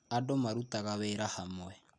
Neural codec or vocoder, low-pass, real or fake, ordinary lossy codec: none; none; real; none